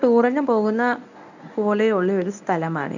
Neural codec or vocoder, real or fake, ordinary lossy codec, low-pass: codec, 24 kHz, 0.9 kbps, WavTokenizer, medium speech release version 1; fake; none; 7.2 kHz